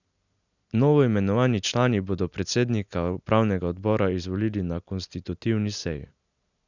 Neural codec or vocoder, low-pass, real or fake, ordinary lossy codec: none; 7.2 kHz; real; none